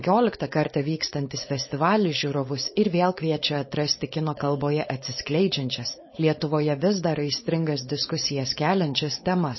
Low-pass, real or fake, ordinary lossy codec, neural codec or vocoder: 7.2 kHz; fake; MP3, 24 kbps; codec, 16 kHz, 4.8 kbps, FACodec